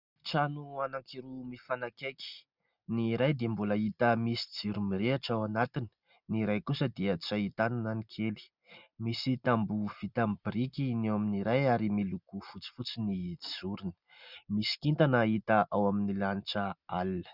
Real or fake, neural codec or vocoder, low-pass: real; none; 5.4 kHz